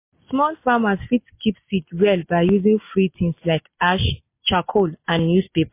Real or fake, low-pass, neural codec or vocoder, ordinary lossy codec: real; 3.6 kHz; none; MP3, 24 kbps